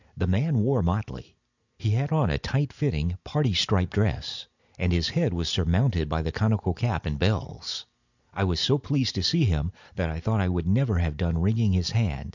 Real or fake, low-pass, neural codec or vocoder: real; 7.2 kHz; none